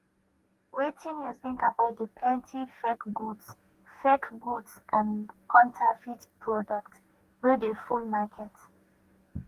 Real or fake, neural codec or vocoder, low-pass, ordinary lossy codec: fake; codec, 44.1 kHz, 2.6 kbps, DAC; 14.4 kHz; Opus, 24 kbps